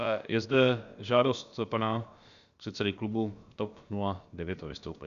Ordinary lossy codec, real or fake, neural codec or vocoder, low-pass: Opus, 64 kbps; fake; codec, 16 kHz, about 1 kbps, DyCAST, with the encoder's durations; 7.2 kHz